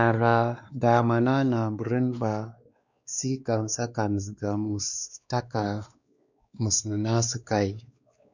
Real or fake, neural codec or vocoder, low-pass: fake; codec, 16 kHz, 2 kbps, X-Codec, WavLM features, trained on Multilingual LibriSpeech; 7.2 kHz